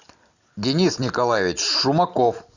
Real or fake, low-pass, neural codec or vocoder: real; 7.2 kHz; none